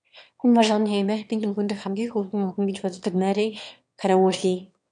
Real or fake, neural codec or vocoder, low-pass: fake; autoencoder, 22.05 kHz, a latent of 192 numbers a frame, VITS, trained on one speaker; 9.9 kHz